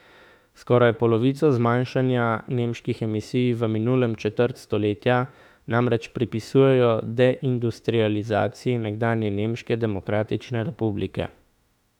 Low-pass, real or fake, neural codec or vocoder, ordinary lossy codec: 19.8 kHz; fake; autoencoder, 48 kHz, 32 numbers a frame, DAC-VAE, trained on Japanese speech; none